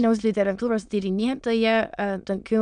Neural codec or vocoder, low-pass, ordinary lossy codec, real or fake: autoencoder, 22.05 kHz, a latent of 192 numbers a frame, VITS, trained on many speakers; 9.9 kHz; MP3, 96 kbps; fake